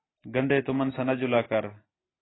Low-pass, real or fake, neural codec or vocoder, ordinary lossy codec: 7.2 kHz; real; none; AAC, 16 kbps